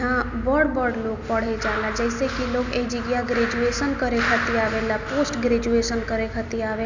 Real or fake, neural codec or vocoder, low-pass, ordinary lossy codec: real; none; 7.2 kHz; none